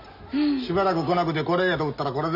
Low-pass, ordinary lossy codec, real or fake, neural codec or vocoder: 5.4 kHz; none; real; none